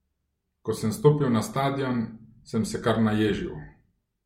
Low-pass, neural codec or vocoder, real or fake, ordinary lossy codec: 19.8 kHz; none; real; MP3, 64 kbps